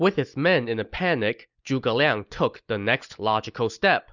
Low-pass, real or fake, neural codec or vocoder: 7.2 kHz; real; none